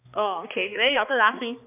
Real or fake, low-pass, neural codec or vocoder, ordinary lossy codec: fake; 3.6 kHz; codec, 16 kHz, 2 kbps, X-Codec, HuBERT features, trained on balanced general audio; none